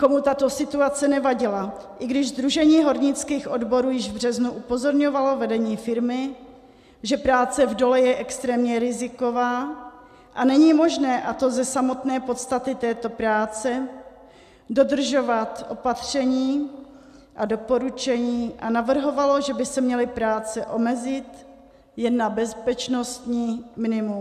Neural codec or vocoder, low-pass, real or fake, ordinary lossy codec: none; 14.4 kHz; real; MP3, 96 kbps